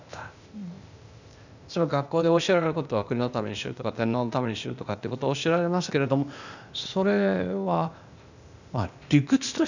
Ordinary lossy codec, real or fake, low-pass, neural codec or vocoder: none; fake; 7.2 kHz; codec, 16 kHz, 0.8 kbps, ZipCodec